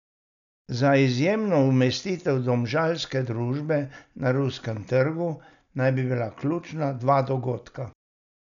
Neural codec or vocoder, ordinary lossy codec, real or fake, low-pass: none; none; real; 7.2 kHz